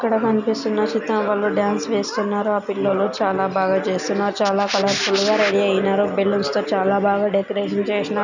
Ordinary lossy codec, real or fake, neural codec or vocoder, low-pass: none; real; none; 7.2 kHz